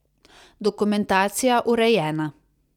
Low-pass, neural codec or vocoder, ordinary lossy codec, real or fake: 19.8 kHz; vocoder, 44.1 kHz, 128 mel bands every 256 samples, BigVGAN v2; none; fake